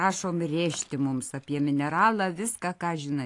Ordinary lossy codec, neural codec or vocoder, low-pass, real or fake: AAC, 48 kbps; none; 10.8 kHz; real